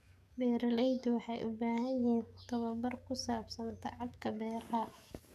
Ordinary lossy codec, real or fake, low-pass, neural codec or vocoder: none; fake; 14.4 kHz; codec, 44.1 kHz, 7.8 kbps, DAC